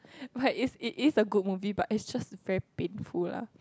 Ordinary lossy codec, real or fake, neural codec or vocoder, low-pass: none; real; none; none